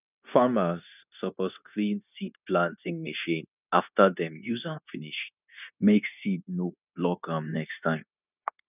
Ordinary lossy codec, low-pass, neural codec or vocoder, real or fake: none; 3.6 kHz; codec, 16 kHz, 0.9 kbps, LongCat-Audio-Codec; fake